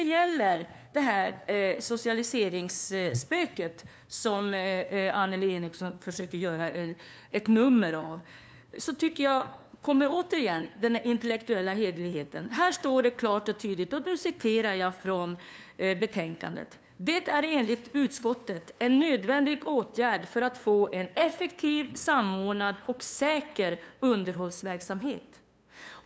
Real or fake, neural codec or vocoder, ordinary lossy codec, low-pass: fake; codec, 16 kHz, 2 kbps, FunCodec, trained on LibriTTS, 25 frames a second; none; none